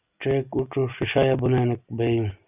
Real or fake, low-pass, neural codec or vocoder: real; 3.6 kHz; none